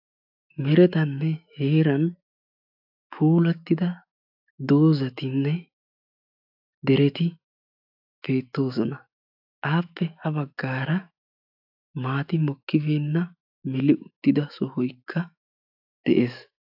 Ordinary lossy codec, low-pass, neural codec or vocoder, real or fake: AAC, 48 kbps; 5.4 kHz; autoencoder, 48 kHz, 128 numbers a frame, DAC-VAE, trained on Japanese speech; fake